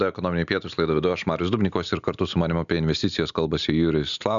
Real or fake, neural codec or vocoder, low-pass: real; none; 7.2 kHz